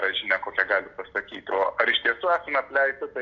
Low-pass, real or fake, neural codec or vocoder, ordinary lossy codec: 7.2 kHz; real; none; AAC, 48 kbps